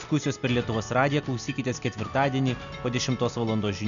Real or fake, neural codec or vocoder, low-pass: real; none; 7.2 kHz